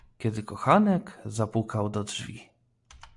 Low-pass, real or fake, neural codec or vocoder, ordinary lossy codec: 10.8 kHz; real; none; AAC, 64 kbps